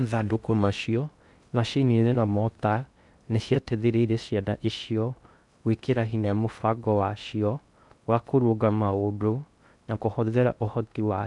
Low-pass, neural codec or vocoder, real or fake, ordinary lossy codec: 10.8 kHz; codec, 16 kHz in and 24 kHz out, 0.6 kbps, FocalCodec, streaming, 2048 codes; fake; none